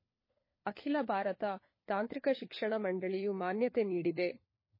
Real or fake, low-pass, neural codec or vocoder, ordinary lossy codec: fake; 5.4 kHz; codec, 16 kHz, 4 kbps, FunCodec, trained on LibriTTS, 50 frames a second; MP3, 24 kbps